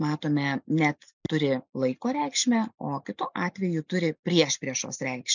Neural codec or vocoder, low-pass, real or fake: none; 7.2 kHz; real